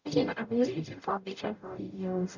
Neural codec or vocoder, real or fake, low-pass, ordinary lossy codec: codec, 44.1 kHz, 0.9 kbps, DAC; fake; 7.2 kHz; none